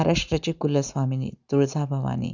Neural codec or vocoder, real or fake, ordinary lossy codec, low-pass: none; real; none; 7.2 kHz